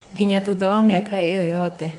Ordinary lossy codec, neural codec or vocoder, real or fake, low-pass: none; codec, 24 kHz, 1 kbps, SNAC; fake; 10.8 kHz